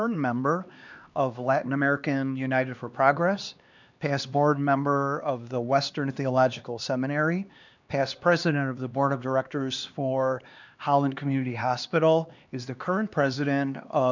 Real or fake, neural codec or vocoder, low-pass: fake; codec, 16 kHz, 2 kbps, X-Codec, HuBERT features, trained on LibriSpeech; 7.2 kHz